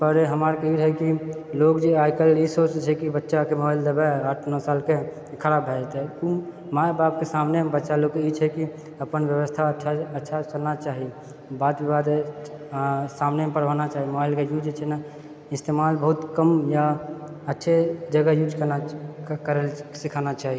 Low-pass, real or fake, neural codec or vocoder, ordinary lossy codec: none; real; none; none